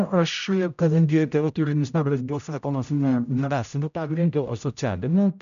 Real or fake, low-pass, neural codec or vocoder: fake; 7.2 kHz; codec, 16 kHz, 0.5 kbps, X-Codec, HuBERT features, trained on general audio